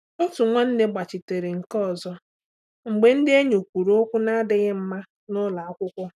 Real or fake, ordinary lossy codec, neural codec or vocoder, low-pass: real; none; none; 14.4 kHz